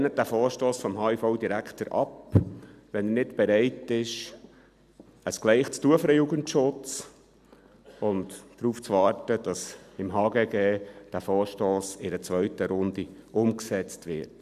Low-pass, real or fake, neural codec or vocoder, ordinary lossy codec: 14.4 kHz; real; none; none